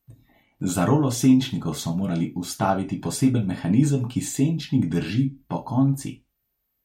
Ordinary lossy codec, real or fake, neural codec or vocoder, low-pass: MP3, 64 kbps; real; none; 19.8 kHz